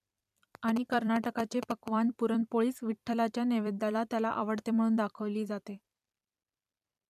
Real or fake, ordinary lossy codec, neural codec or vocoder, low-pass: fake; none; vocoder, 44.1 kHz, 128 mel bands every 256 samples, BigVGAN v2; 14.4 kHz